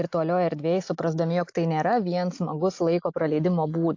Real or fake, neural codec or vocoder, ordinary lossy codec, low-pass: real; none; AAC, 48 kbps; 7.2 kHz